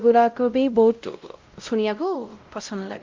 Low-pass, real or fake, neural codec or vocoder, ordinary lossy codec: 7.2 kHz; fake; codec, 16 kHz, 0.5 kbps, X-Codec, WavLM features, trained on Multilingual LibriSpeech; Opus, 24 kbps